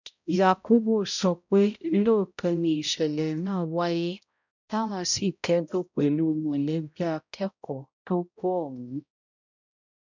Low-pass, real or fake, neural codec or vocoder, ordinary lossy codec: 7.2 kHz; fake; codec, 16 kHz, 0.5 kbps, X-Codec, HuBERT features, trained on balanced general audio; none